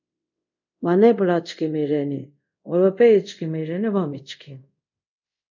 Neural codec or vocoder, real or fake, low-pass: codec, 24 kHz, 0.5 kbps, DualCodec; fake; 7.2 kHz